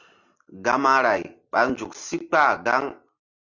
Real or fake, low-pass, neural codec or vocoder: real; 7.2 kHz; none